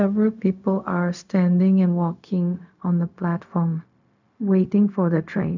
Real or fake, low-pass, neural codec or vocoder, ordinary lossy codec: fake; 7.2 kHz; codec, 16 kHz, 0.4 kbps, LongCat-Audio-Codec; none